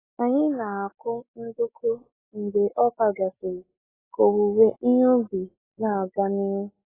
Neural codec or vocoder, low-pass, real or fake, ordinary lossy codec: none; 3.6 kHz; real; AAC, 16 kbps